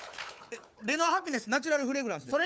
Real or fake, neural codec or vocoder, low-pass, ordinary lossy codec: fake; codec, 16 kHz, 4 kbps, FunCodec, trained on Chinese and English, 50 frames a second; none; none